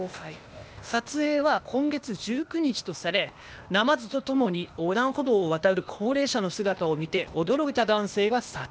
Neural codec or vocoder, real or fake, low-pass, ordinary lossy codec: codec, 16 kHz, 0.8 kbps, ZipCodec; fake; none; none